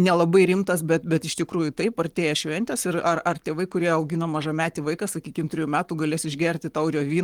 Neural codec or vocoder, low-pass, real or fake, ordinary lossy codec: codec, 44.1 kHz, 7.8 kbps, Pupu-Codec; 19.8 kHz; fake; Opus, 24 kbps